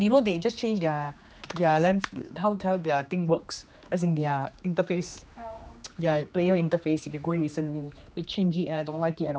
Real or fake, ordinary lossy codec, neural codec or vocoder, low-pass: fake; none; codec, 16 kHz, 2 kbps, X-Codec, HuBERT features, trained on general audio; none